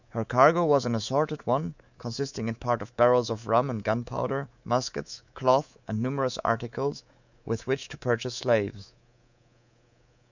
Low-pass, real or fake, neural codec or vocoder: 7.2 kHz; fake; codec, 24 kHz, 3.1 kbps, DualCodec